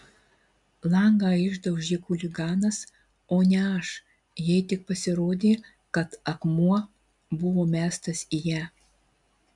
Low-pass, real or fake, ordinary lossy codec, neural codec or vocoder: 10.8 kHz; real; MP3, 96 kbps; none